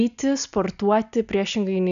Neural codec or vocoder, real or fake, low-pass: none; real; 7.2 kHz